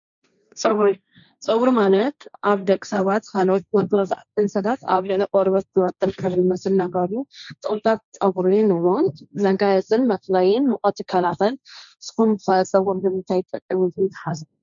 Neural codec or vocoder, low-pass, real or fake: codec, 16 kHz, 1.1 kbps, Voila-Tokenizer; 7.2 kHz; fake